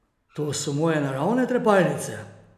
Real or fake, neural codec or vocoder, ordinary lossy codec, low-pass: real; none; none; 14.4 kHz